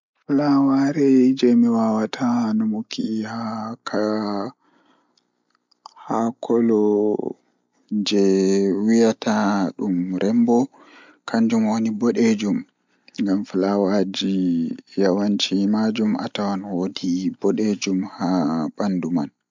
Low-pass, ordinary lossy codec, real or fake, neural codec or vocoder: 7.2 kHz; MP3, 64 kbps; real; none